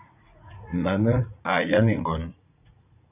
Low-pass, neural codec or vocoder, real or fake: 3.6 kHz; vocoder, 44.1 kHz, 80 mel bands, Vocos; fake